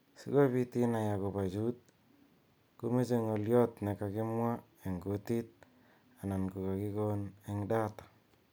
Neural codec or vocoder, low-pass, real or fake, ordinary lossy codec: none; none; real; none